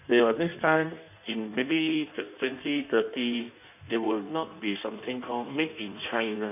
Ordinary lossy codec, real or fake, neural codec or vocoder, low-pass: none; fake; codec, 16 kHz in and 24 kHz out, 1.1 kbps, FireRedTTS-2 codec; 3.6 kHz